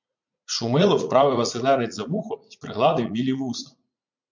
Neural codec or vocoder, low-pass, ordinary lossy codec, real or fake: vocoder, 44.1 kHz, 80 mel bands, Vocos; 7.2 kHz; MP3, 64 kbps; fake